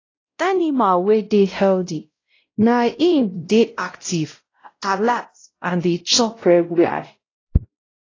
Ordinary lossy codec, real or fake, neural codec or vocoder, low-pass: AAC, 32 kbps; fake; codec, 16 kHz, 0.5 kbps, X-Codec, WavLM features, trained on Multilingual LibriSpeech; 7.2 kHz